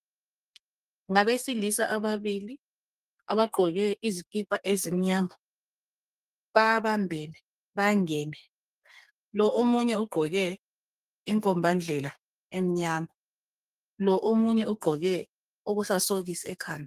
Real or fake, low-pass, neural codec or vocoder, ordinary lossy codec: fake; 14.4 kHz; codec, 32 kHz, 1.9 kbps, SNAC; Opus, 32 kbps